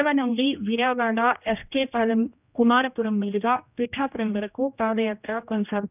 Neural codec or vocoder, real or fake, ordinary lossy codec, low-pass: codec, 16 kHz, 1 kbps, X-Codec, HuBERT features, trained on general audio; fake; none; 3.6 kHz